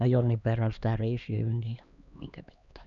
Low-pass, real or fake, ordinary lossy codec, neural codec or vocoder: 7.2 kHz; fake; none; codec, 16 kHz, 2 kbps, X-Codec, HuBERT features, trained on LibriSpeech